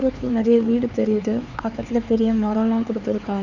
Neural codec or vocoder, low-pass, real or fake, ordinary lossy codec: codec, 16 kHz, 4 kbps, X-Codec, HuBERT features, trained on LibriSpeech; 7.2 kHz; fake; Opus, 64 kbps